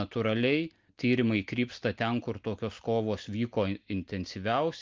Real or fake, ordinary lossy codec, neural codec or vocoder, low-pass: real; Opus, 32 kbps; none; 7.2 kHz